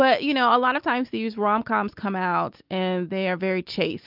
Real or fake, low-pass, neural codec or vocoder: real; 5.4 kHz; none